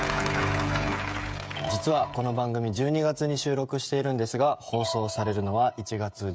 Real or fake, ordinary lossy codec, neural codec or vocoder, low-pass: fake; none; codec, 16 kHz, 16 kbps, FreqCodec, smaller model; none